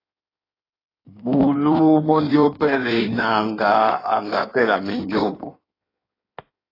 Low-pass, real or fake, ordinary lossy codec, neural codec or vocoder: 5.4 kHz; fake; AAC, 24 kbps; codec, 16 kHz in and 24 kHz out, 1.1 kbps, FireRedTTS-2 codec